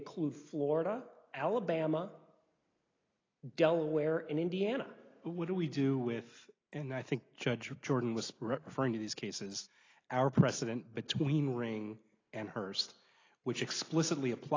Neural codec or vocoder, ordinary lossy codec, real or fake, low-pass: none; AAC, 32 kbps; real; 7.2 kHz